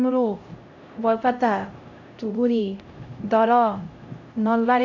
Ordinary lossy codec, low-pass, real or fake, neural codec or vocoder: AAC, 48 kbps; 7.2 kHz; fake; codec, 16 kHz, 0.5 kbps, X-Codec, HuBERT features, trained on LibriSpeech